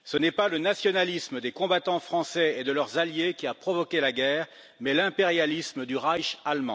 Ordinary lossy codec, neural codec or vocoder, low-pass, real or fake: none; none; none; real